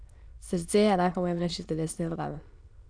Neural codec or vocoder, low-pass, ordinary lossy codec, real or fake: autoencoder, 22.05 kHz, a latent of 192 numbers a frame, VITS, trained on many speakers; 9.9 kHz; none; fake